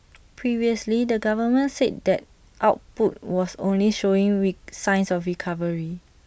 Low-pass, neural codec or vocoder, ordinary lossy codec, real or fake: none; none; none; real